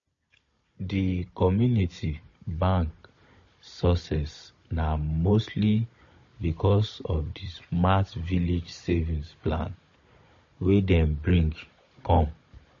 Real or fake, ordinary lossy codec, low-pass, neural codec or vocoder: fake; MP3, 32 kbps; 7.2 kHz; codec, 16 kHz, 16 kbps, FunCodec, trained on Chinese and English, 50 frames a second